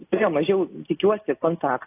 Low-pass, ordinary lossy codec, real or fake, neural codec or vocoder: 3.6 kHz; AAC, 24 kbps; real; none